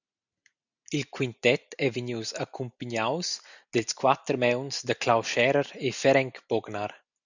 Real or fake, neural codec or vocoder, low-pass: real; none; 7.2 kHz